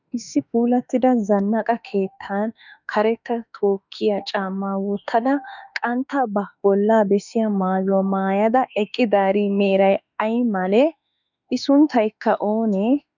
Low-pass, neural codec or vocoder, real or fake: 7.2 kHz; autoencoder, 48 kHz, 32 numbers a frame, DAC-VAE, trained on Japanese speech; fake